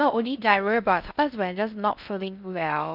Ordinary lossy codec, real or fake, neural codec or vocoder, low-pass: none; fake; codec, 16 kHz in and 24 kHz out, 0.6 kbps, FocalCodec, streaming, 4096 codes; 5.4 kHz